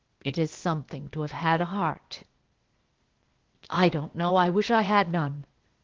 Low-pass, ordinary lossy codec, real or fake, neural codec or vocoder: 7.2 kHz; Opus, 16 kbps; fake; codec, 16 kHz, 0.8 kbps, ZipCodec